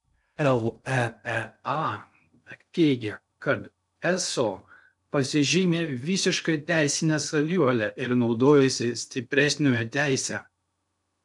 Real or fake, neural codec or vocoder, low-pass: fake; codec, 16 kHz in and 24 kHz out, 0.6 kbps, FocalCodec, streaming, 2048 codes; 10.8 kHz